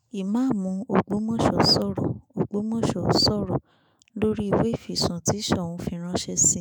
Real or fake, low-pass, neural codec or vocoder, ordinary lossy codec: fake; none; autoencoder, 48 kHz, 128 numbers a frame, DAC-VAE, trained on Japanese speech; none